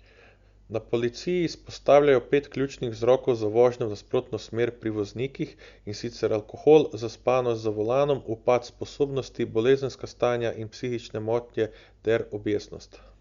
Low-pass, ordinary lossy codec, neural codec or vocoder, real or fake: 7.2 kHz; Opus, 64 kbps; none; real